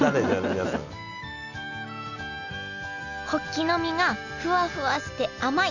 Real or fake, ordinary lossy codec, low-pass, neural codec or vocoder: real; none; 7.2 kHz; none